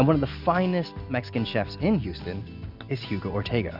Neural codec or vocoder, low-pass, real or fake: none; 5.4 kHz; real